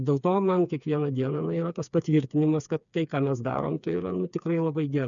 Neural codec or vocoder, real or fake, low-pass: codec, 16 kHz, 4 kbps, FreqCodec, smaller model; fake; 7.2 kHz